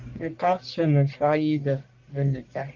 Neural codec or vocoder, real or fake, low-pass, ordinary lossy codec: codec, 44.1 kHz, 1.7 kbps, Pupu-Codec; fake; 7.2 kHz; Opus, 32 kbps